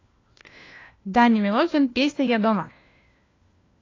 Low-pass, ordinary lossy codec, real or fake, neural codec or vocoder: 7.2 kHz; AAC, 32 kbps; fake; codec, 16 kHz, 1 kbps, FunCodec, trained on LibriTTS, 50 frames a second